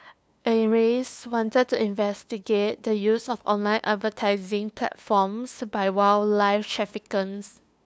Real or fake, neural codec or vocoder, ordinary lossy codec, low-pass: fake; codec, 16 kHz, 2 kbps, FunCodec, trained on LibriTTS, 25 frames a second; none; none